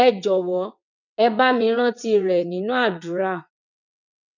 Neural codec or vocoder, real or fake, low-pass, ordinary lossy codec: vocoder, 22.05 kHz, 80 mel bands, WaveNeXt; fake; 7.2 kHz; none